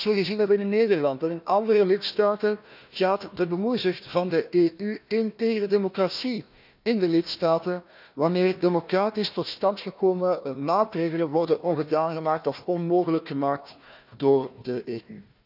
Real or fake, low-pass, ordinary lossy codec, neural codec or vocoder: fake; 5.4 kHz; none; codec, 16 kHz, 1 kbps, FunCodec, trained on Chinese and English, 50 frames a second